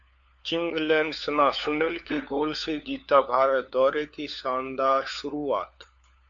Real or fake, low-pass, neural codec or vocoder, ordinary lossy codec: fake; 7.2 kHz; codec, 16 kHz, 4 kbps, FunCodec, trained on LibriTTS, 50 frames a second; AAC, 64 kbps